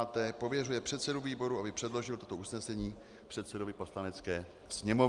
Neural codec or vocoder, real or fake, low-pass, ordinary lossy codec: none; real; 10.8 kHz; Opus, 32 kbps